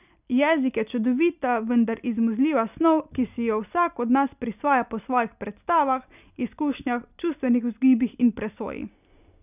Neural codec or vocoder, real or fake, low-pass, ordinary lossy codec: none; real; 3.6 kHz; none